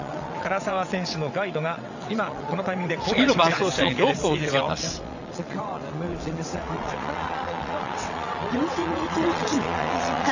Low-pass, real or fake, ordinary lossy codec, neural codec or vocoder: 7.2 kHz; fake; none; vocoder, 22.05 kHz, 80 mel bands, WaveNeXt